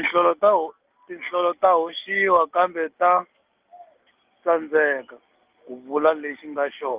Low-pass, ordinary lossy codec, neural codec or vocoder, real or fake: 3.6 kHz; Opus, 32 kbps; none; real